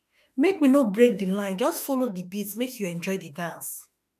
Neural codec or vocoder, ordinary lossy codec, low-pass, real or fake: autoencoder, 48 kHz, 32 numbers a frame, DAC-VAE, trained on Japanese speech; none; 14.4 kHz; fake